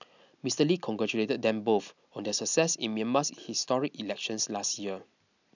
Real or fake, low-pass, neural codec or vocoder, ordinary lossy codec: real; 7.2 kHz; none; none